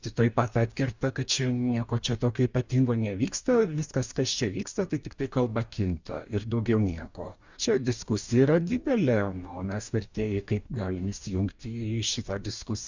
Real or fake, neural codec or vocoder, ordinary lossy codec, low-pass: fake; codec, 44.1 kHz, 2.6 kbps, DAC; Opus, 64 kbps; 7.2 kHz